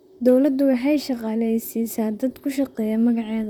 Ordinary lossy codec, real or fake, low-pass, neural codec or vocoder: none; fake; 19.8 kHz; vocoder, 44.1 kHz, 128 mel bands, Pupu-Vocoder